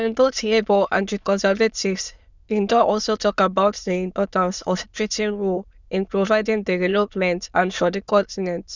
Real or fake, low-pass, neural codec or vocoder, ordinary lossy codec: fake; 7.2 kHz; autoencoder, 22.05 kHz, a latent of 192 numbers a frame, VITS, trained on many speakers; Opus, 64 kbps